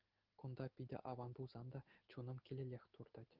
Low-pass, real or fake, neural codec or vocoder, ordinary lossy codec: 5.4 kHz; real; none; Opus, 16 kbps